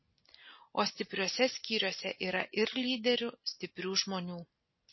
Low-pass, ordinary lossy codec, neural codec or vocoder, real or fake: 7.2 kHz; MP3, 24 kbps; none; real